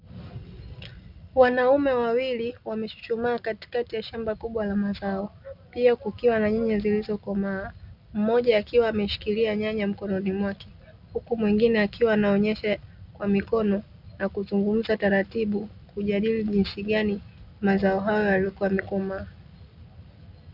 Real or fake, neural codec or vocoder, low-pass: real; none; 5.4 kHz